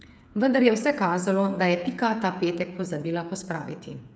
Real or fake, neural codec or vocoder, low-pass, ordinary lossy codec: fake; codec, 16 kHz, 8 kbps, FreqCodec, smaller model; none; none